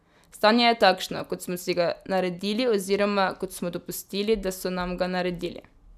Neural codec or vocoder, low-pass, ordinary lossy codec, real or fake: none; 14.4 kHz; none; real